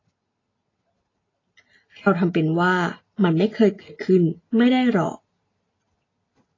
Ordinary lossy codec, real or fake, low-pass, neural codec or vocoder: AAC, 32 kbps; real; 7.2 kHz; none